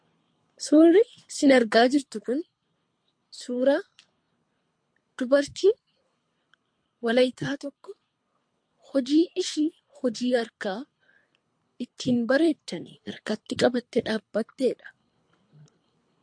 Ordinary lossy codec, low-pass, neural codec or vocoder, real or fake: MP3, 48 kbps; 9.9 kHz; codec, 24 kHz, 3 kbps, HILCodec; fake